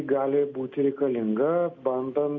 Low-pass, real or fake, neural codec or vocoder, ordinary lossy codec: 7.2 kHz; real; none; MP3, 48 kbps